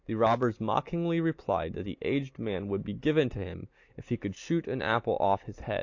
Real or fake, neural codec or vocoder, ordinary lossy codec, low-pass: real; none; AAC, 48 kbps; 7.2 kHz